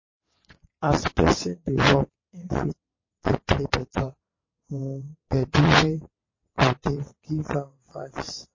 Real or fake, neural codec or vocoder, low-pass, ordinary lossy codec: fake; vocoder, 22.05 kHz, 80 mel bands, WaveNeXt; 7.2 kHz; MP3, 32 kbps